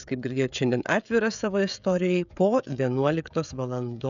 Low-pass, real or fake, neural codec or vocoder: 7.2 kHz; fake; codec, 16 kHz, 16 kbps, FreqCodec, smaller model